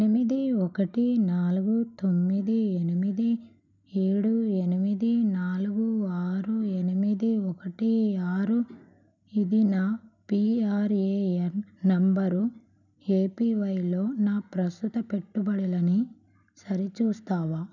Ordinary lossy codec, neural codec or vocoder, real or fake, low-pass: none; none; real; 7.2 kHz